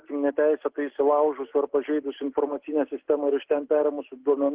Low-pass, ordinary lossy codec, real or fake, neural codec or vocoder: 3.6 kHz; Opus, 16 kbps; real; none